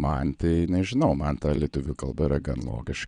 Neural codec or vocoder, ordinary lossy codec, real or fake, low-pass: vocoder, 22.05 kHz, 80 mel bands, WaveNeXt; Opus, 64 kbps; fake; 9.9 kHz